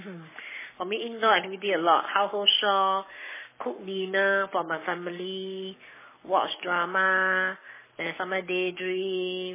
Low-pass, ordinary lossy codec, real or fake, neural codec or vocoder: 3.6 kHz; MP3, 16 kbps; fake; codec, 44.1 kHz, 7.8 kbps, Pupu-Codec